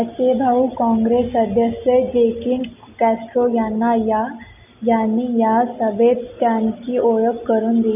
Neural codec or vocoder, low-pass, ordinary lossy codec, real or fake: none; 3.6 kHz; none; real